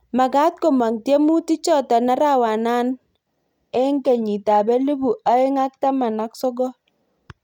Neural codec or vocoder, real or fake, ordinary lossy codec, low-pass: none; real; none; 19.8 kHz